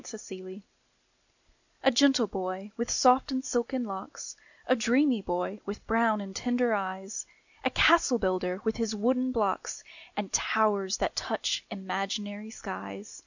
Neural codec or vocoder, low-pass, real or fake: none; 7.2 kHz; real